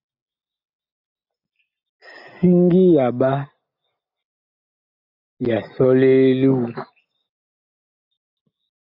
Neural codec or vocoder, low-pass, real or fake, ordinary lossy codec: none; 5.4 kHz; real; AAC, 48 kbps